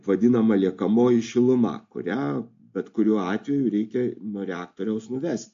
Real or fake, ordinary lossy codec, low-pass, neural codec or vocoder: real; AAC, 48 kbps; 7.2 kHz; none